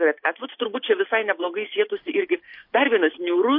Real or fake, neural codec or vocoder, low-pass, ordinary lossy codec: real; none; 5.4 kHz; MP3, 32 kbps